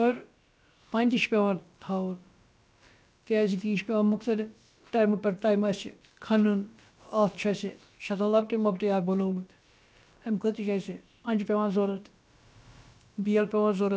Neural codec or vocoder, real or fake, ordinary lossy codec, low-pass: codec, 16 kHz, about 1 kbps, DyCAST, with the encoder's durations; fake; none; none